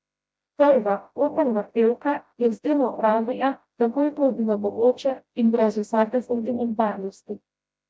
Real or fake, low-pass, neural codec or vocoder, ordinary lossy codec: fake; none; codec, 16 kHz, 0.5 kbps, FreqCodec, smaller model; none